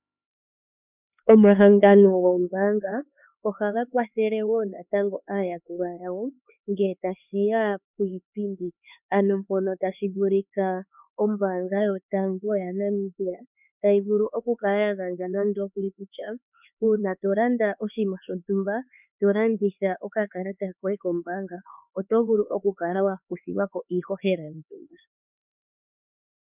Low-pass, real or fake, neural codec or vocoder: 3.6 kHz; fake; codec, 16 kHz, 4 kbps, X-Codec, HuBERT features, trained on LibriSpeech